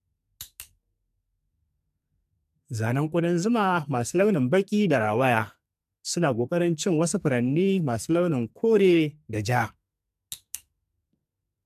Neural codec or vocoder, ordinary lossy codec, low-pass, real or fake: codec, 44.1 kHz, 2.6 kbps, SNAC; MP3, 96 kbps; 14.4 kHz; fake